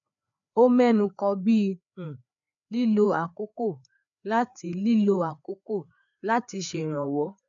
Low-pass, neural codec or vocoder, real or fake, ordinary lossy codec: 7.2 kHz; codec, 16 kHz, 4 kbps, FreqCodec, larger model; fake; none